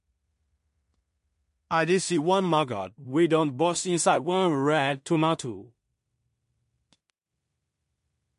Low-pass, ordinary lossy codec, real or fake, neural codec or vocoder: 10.8 kHz; MP3, 48 kbps; fake; codec, 16 kHz in and 24 kHz out, 0.4 kbps, LongCat-Audio-Codec, two codebook decoder